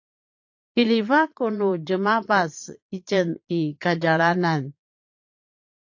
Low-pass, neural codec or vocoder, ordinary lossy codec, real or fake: 7.2 kHz; vocoder, 22.05 kHz, 80 mel bands, Vocos; AAC, 48 kbps; fake